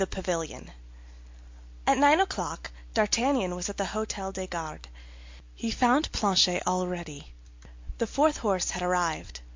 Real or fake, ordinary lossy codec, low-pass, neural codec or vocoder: real; MP3, 48 kbps; 7.2 kHz; none